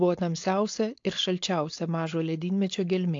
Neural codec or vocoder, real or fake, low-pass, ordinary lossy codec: codec, 16 kHz, 4.8 kbps, FACodec; fake; 7.2 kHz; AAC, 48 kbps